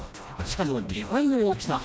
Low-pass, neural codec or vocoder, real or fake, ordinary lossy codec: none; codec, 16 kHz, 1 kbps, FreqCodec, smaller model; fake; none